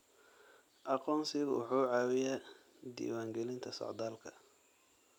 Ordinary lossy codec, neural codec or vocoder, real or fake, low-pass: none; none; real; 19.8 kHz